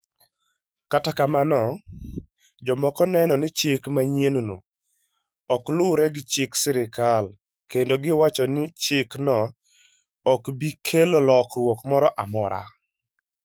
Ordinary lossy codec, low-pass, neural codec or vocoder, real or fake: none; none; codec, 44.1 kHz, 7.8 kbps, DAC; fake